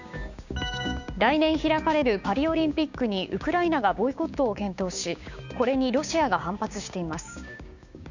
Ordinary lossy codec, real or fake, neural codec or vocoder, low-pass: none; fake; codec, 16 kHz, 6 kbps, DAC; 7.2 kHz